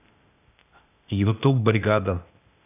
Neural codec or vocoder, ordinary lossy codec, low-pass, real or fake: codec, 16 kHz, 0.8 kbps, ZipCodec; none; 3.6 kHz; fake